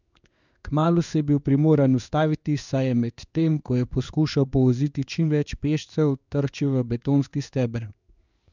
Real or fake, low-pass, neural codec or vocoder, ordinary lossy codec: fake; 7.2 kHz; codec, 16 kHz in and 24 kHz out, 1 kbps, XY-Tokenizer; none